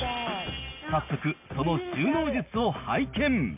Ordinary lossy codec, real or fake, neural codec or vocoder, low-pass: MP3, 32 kbps; real; none; 3.6 kHz